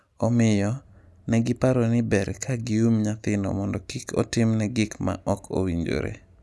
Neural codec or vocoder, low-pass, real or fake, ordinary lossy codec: none; none; real; none